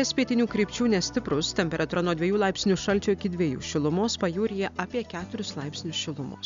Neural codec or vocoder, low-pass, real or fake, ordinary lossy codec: none; 7.2 kHz; real; MP3, 64 kbps